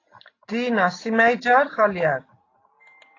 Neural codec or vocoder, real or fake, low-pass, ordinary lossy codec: none; real; 7.2 kHz; AAC, 32 kbps